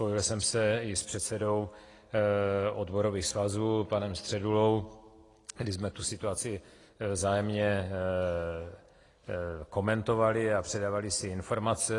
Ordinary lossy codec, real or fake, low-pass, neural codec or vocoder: AAC, 32 kbps; real; 10.8 kHz; none